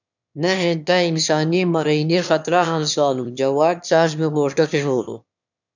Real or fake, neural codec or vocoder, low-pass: fake; autoencoder, 22.05 kHz, a latent of 192 numbers a frame, VITS, trained on one speaker; 7.2 kHz